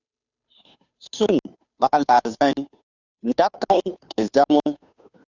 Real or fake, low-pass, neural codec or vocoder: fake; 7.2 kHz; codec, 16 kHz, 2 kbps, FunCodec, trained on Chinese and English, 25 frames a second